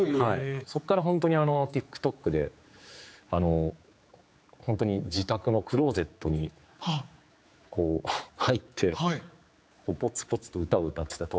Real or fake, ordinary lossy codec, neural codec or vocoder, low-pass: fake; none; codec, 16 kHz, 4 kbps, X-Codec, HuBERT features, trained on general audio; none